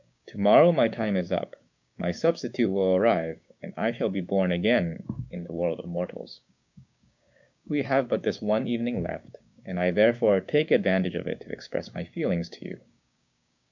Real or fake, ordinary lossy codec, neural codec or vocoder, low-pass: fake; MP3, 64 kbps; codec, 16 kHz, 6 kbps, DAC; 7.2 kHz